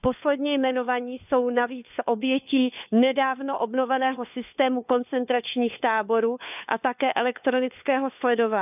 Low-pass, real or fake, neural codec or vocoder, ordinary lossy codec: 3.6 kHz; fake; codec, 16 kHz, 4 kbps, FunCodec, trained on LibriTTS, 50 frames a second; none